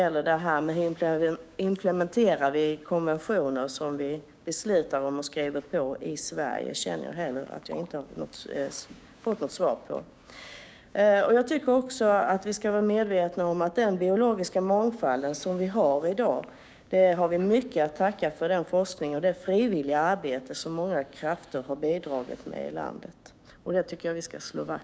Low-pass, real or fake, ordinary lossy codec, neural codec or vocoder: none; fake; none; codec, 16 kHz, 6 kbps, DAC